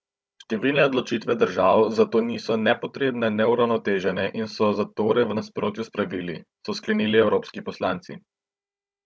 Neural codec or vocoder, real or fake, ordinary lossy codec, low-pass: codec, 16 kHz, 16 kbps, FunCodec, trained on Chinese and English, 50 frames a second; fake; none; none